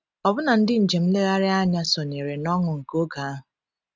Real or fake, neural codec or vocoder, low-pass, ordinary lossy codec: real; none; none; none